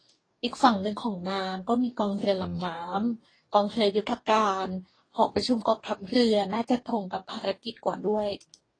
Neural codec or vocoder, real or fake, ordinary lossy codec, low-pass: codec, 44.1 kHz, 2.6 kbps, DAC; fake; AAC, 32 kbps; 9.9 kHz